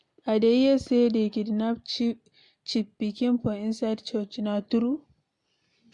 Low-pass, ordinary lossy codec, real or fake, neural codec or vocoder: 10.8 kHz; MP3, 64 kbps; real; none